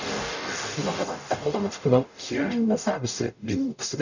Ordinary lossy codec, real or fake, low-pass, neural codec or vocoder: none; fake; 7.2 kHz; codec, 44.1 kHz, 0.9 kbps, DAC